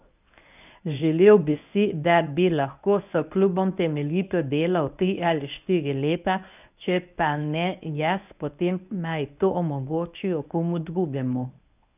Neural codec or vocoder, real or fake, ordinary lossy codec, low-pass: codec, 24 kHz, 0.9 kbps, WavTokenizer, medium speech release version 1; fake; none; 3.6 kHz